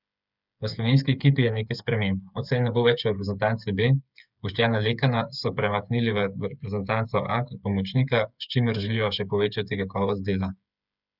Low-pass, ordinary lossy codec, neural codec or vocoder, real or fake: 5.4 kHz; none; codec, 16 kHz, 8 kbps, FreqCodec, smaller model; fake